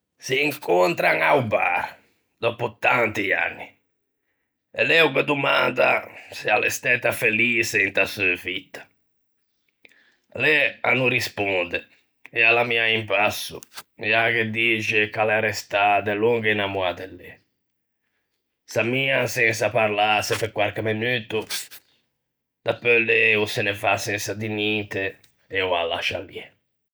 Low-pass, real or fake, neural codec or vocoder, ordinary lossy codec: none; real; none; none